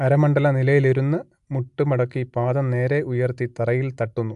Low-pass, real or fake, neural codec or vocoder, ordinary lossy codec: 10.8 kHz; real; none; AAC, 64 kbps